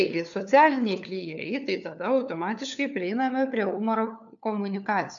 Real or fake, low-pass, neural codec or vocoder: fake; 7.2 kHz; codec, 16 kHz, 4 kbps, FunCodec, trained on LibriTTS, 50 frames a second